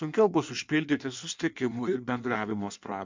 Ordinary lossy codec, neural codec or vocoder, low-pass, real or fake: MP3, 64 kbps; codec, 16 kHz in and 24 kHz out, 1.1 kbps, FireRedTTS-2 codec; 7.2 kHz; fake